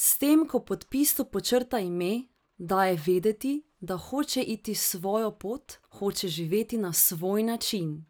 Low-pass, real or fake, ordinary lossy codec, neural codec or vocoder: none; real; none; none